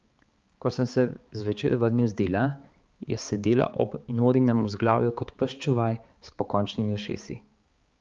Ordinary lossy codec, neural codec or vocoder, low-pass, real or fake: Opus, 32 kbps; codec, 16 kHz, 4 kbps, X-Codec, HuBERT features, trained on balanced general audio; 7.2 kHz; fake